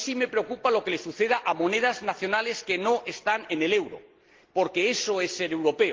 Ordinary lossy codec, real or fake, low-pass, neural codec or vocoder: Opus, 16 kbps; real; 7.2 kHz; none